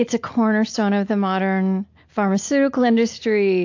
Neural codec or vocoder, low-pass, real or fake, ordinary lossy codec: none; 7.2 kHz; real; AAC, 48 kbps